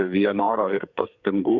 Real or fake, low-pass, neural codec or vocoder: fake; 7.2 kHz; codec, 44.1 kHz, 2.6 kbps, SNAC